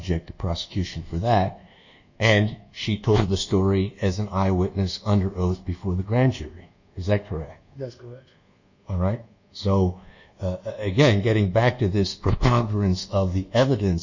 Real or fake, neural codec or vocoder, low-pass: fake; codec, 24 kHz, 1.2 kbps, DualCodec; 7.2 kHz